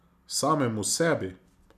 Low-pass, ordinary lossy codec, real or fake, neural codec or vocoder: 14.4 kHz; none; real; none